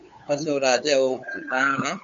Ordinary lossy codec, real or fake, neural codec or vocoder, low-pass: MP3, 48 kbps; fake; codec, 16 kHz, 8 kbps, FunCodec, trained on LibriTTS, 25 frames a second; 7.2 kHz